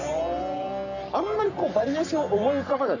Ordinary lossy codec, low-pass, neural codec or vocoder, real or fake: none; 7.2 kHz; codec, 44.1 kHz, 3.4 kbps, Pupu-Codec; fake